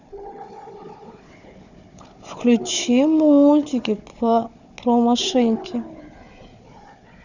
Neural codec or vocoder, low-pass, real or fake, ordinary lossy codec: codec, 16 kHz, 4 kbps, FunCodec, trained on Chinese and English, 50 frames a second; 7.2 kHz; fake; none